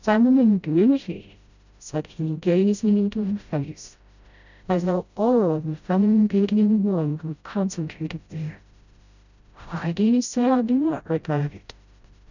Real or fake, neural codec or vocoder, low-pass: fake; codec, 16 kHz, 0.5 kbps, FreqCodec, smaller model; 7.2 kHz